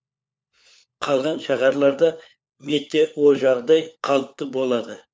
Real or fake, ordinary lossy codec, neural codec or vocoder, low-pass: fake; none; codec, 16 kHz, 4 kbps, FunCodec, trained on LibriTTS, 50 frames a second; none